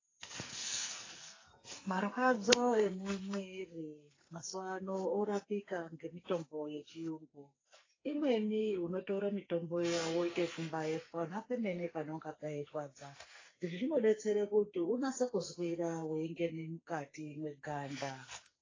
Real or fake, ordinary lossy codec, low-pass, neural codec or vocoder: fake; AAC, 32 kbps; 7.2 kHz; codec, 44.1 kHz, 2.6 kbps, SNAC